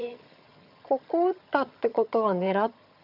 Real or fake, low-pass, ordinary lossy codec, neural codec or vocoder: fake; 5.4 kHz; none; vocoder, 22.05 kHz, 80 mel bands, HiFi-GAN